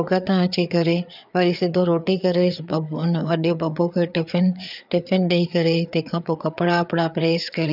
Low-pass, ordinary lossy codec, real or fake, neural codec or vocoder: 5.4 kHz; none; fake; codec, 16 kHz, 4 kbps, FreqCodec, larger model